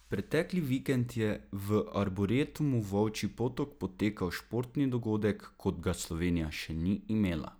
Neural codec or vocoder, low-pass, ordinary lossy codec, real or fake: none; none; none; real